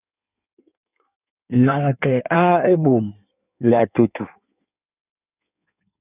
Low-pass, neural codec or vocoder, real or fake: 3.6 kHz; codec, 16 kHz in and 24 kHz out, 1.1 kbps, FireRedTTS-2 codec; fake